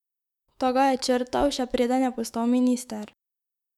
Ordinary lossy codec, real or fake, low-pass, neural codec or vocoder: none; fake; 19.8 kHz; autoencoder, 48 kHz, 128 numbers a frame, DAC-VAE, trained on Japanese speech